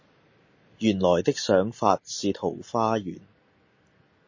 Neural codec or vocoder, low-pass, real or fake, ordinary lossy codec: none; 7.2 kHz; real; MP3, 32 kbps